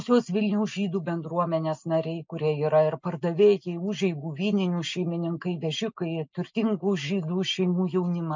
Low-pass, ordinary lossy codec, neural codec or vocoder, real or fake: 7.2 kHz; MP3, 64 kbps; none; real